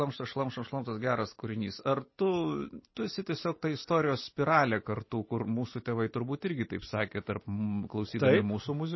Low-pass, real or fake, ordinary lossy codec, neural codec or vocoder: 7.2 kHz; real; MP3, 24 kbps; none